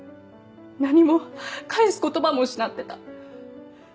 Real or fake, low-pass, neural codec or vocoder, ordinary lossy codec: real; none; none; none